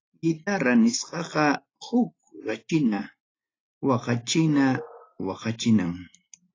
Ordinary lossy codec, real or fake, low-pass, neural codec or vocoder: AAC, 32 kbps; real; 7.2 kHz; none